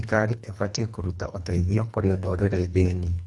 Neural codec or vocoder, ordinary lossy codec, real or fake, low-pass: codec, 24 kHz, 1.5 kbps, HILCodec; none; fake; none